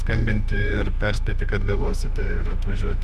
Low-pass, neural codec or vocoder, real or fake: 14.4 kHz; autoencoder, 48 kHz, 32 numbers a frame, DAC-VAE, trained on Japanese speech; fake